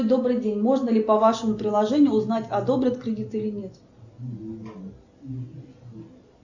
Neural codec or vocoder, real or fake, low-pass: none; real; 7.2 kHz